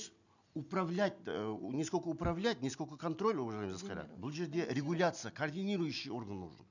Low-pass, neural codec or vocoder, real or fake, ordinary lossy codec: 7.2 kHz; none; real; none